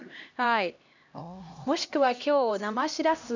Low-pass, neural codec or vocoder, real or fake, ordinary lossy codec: 7.2 kHz; codec, 16 kHz, 1 kbps, X-Codec, HuBERT features, trained on LibriSpeech; fake; none